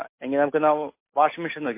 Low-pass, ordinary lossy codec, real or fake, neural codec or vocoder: 3.6 kHz; MP3, 24 kbps; real; none